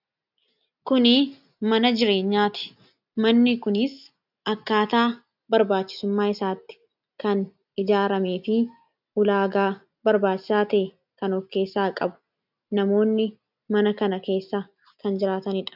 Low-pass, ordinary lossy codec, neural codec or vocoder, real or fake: 5.4 kHz; AAC, 48 kbps; none; real